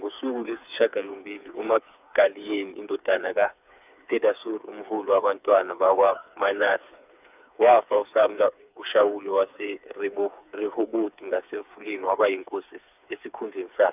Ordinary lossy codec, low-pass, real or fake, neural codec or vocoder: none; 3.6 kHz; fake; codec, 16 kHz, 4 kbps, FreqCodec, smaller model